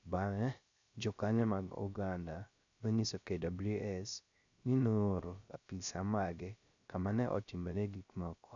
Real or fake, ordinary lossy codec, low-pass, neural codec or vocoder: fake; none; 7.2 kHz; codec, 16 kHz, 0.3 kbps, FocalCodec